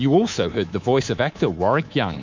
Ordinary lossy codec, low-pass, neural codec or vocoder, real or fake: MP3, 64 kbps; 7.2 kHz; codec, 24 kHz, 3.1 kbps, DualCodec; fake